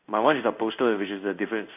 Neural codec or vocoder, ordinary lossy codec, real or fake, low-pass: codec, 16 kHz in and 24 kHz out, 1 kbps, XY-Tokenizer; none; fake; 3.6 kHz